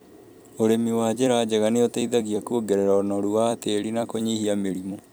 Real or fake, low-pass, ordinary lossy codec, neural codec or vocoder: fake; none; none; vocoder, 44.1 kHz, 128 mel bands every 256 samples, BigVGAN v2